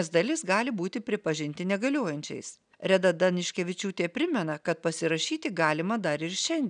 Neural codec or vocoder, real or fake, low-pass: none; real; 9.9 kHz